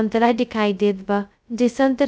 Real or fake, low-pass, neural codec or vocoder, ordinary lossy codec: fake; none; codec, 16 kHz, 0.2 kbps, FocalCodec; none